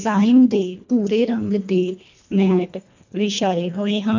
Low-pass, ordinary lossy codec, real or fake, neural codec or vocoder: 7.2 kHz; none; fake; codec, 24 kHz, 1.5 kbps, HILCodec